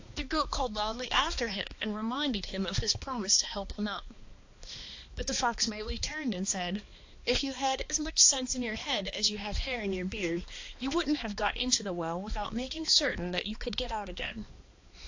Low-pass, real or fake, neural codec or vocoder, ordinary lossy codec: 7.2 kHz; fake; codec, 16 kHz, 2 kbps, X-Codec, HuBERT features, trained on balanced general audio; AAC, 48 kbps